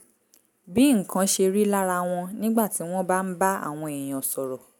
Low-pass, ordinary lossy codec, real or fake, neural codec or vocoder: none; none; real; none